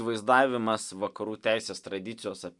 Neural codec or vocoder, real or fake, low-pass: none; real; 10.8 kHz